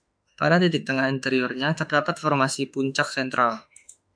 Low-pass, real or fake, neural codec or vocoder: 9.9 kHz; fake; autoencoder, 48 kHz, 32 numbers a frame, DAC-VAE, trained on Japanese speech